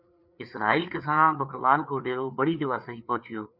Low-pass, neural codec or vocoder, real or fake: 5.4 kHz; codec, 16 kHz, 4 kbps, FreqCodec, larger model; fake